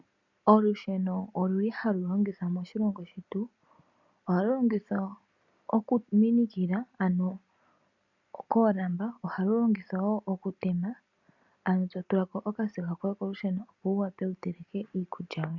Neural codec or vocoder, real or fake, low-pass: none; real; 7.2 kHz